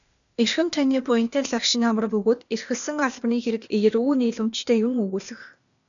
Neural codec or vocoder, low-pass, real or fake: codec, 16 kHz, 0.8 kbps, ZipCodec; 7.2 kHz; fake